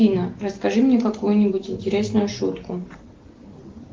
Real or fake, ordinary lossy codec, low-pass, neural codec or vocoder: real; Opus, 16 kbps; 7.2 kHz; none